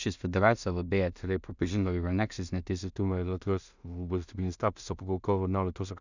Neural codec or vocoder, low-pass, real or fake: codec, 16 kHz in and 24 kHz out, 0.4 kbps, LongCat-Audio-Codec, two codebook decoder; 7.2 kHz; fake